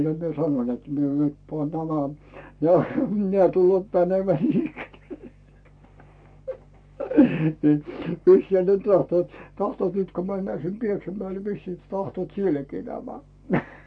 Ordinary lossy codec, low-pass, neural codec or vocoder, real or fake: none; 9.9 kHz; codec, 44.1 kHz, 7.8 kbps, Pupu-Codec; fake